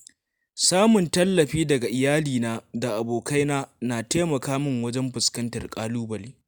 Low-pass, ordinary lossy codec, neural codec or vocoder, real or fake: none; none; none; real